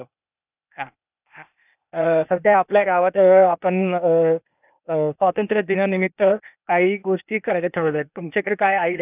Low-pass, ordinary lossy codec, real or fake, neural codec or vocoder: 3.6 kHz; none; fake; codec, 16 kHz, 0.8 kbps, ZipCodec